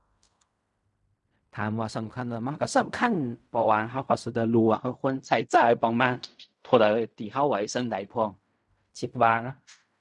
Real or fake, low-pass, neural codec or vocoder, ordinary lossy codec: fake; 10.8 kHz; codec, 16 kHz in and 24 kHz out, 0.4 kbps, LongCat-Audio-Codec, fine tuned four codebook decoder; none